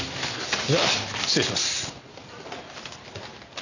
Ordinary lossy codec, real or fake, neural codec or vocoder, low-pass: none; real; none; 7.2 kHz